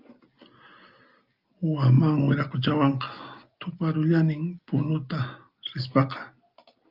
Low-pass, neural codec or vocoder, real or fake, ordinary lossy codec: 5.4 kHz; none; real; Opus, 24 kbps